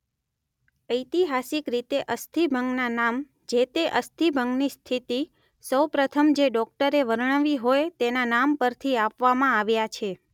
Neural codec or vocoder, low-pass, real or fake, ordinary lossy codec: none; 19.8 kHz; real; none